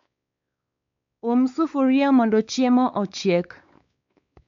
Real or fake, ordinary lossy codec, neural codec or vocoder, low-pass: fake; MP3, 64 kbps; codec, 16 kHz, 4 kbps, X-Codec, WavLM features, trained on Multilingual LibriSpeech; 7.2 kHz